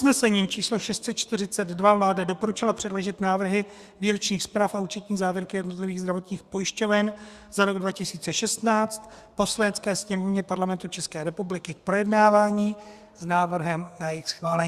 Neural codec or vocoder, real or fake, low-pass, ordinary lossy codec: codec, 32 kHz, 1.9 kbps, SNAC; fake; 14.4 kHz; Opus, 64 kbps